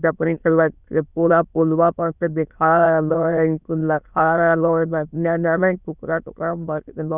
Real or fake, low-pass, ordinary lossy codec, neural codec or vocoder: fake; 3.6 kHz; none; autoencoder, 22.05 kHz, a latent of 192 numbers a frame, VITS, trained on many speakers